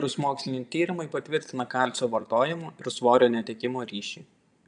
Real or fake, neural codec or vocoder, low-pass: fake; vocoder, 22.05 kHz, 80 mel bands, Vocos; 9.9 kHz